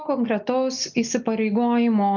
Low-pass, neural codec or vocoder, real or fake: 7.2 kHz; none; real